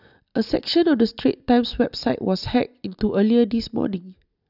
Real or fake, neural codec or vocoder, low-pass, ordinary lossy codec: real; none; 5.4 kHz; none